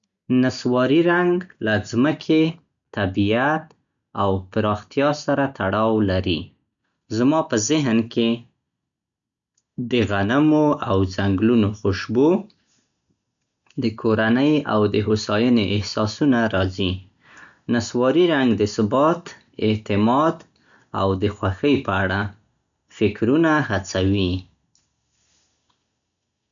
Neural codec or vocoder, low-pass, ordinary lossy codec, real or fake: none; 7.2 kHz; none; real